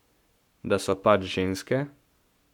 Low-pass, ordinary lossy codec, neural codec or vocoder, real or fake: 19.8 kHz; none; codec, 44.1 kHz, 7.8 kbps, Pupu-Codec; fake